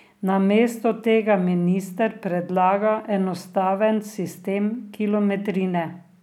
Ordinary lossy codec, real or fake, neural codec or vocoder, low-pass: none; real; none; 19.8 kHz